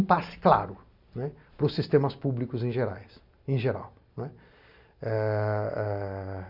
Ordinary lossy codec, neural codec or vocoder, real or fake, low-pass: none; none; real; 5.4 kHz